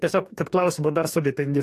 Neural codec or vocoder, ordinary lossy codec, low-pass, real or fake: codec, 44.1 kHz, 2.6 kbps, DAC; AAC, 64 kbps; 14.4 kHz; fake